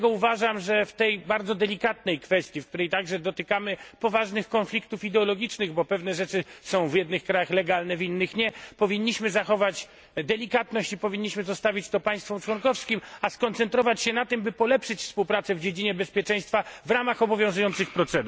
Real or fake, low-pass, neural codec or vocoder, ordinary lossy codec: real; none; none; none